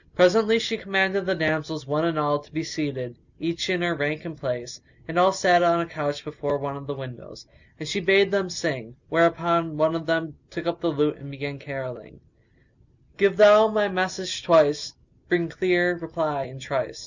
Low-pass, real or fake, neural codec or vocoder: 7.2 kHz; real; none